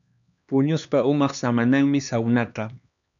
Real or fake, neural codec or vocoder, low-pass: fake; codec, 16 kHz, 2 kbps, X-Codec, HuBERT features, trained on LibriSpeech; 7.2 kHz